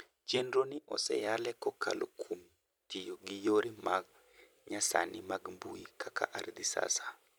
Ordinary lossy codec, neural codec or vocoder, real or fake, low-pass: none; vocoder, 44.1 kHz, 128 mel bands every 256 samples, BigVGAN v2; fake; none